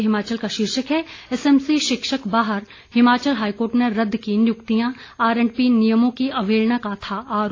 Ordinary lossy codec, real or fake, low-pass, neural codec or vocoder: AAC, 32 kbps; real; 7.2 kHz; none